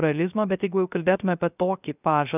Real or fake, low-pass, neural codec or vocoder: fake; 3.6 kHz; codec, 16 kHz, 0.3 kbps, FocalCodec